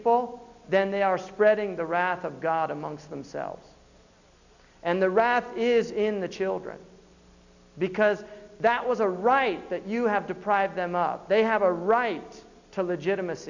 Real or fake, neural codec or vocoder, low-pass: real; none; 7.2 kHz